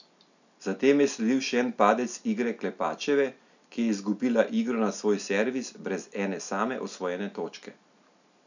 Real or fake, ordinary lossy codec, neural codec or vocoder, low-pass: real; none; none; 7.2 kHz